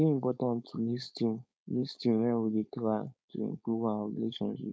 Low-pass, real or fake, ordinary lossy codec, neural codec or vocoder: none; fake; none; codec, 16 kHz, 4.8 kbps, FACodec